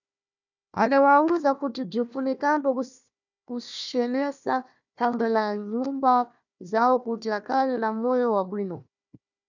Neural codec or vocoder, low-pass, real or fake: codec, 16 kHz, 1 kbps, FunCodec, trained on Chinese and English, 50 frames a second; 7.2 kHz; fake